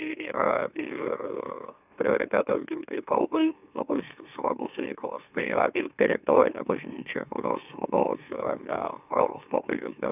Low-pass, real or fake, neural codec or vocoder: 3.6 kHz; fake; autoencoder, 44.1 kHz, a latent of 192 numbers a frame, MeloTTS